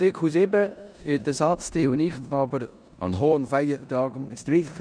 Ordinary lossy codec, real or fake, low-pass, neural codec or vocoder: none; fake; 9.9 kHz; codec, 16 kHz in and 24 kHz out, 0.9 kbps, LongCat-Audio-Codec, four codebook decoder